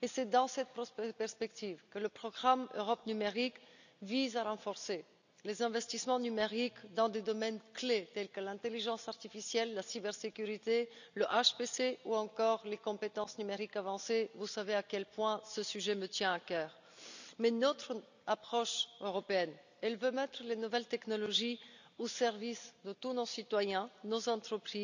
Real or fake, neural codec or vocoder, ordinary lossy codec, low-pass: real; none; none; 7.2 kHz